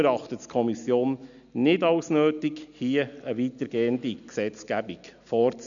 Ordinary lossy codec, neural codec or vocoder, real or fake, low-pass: none; none; real; 7.2 kHz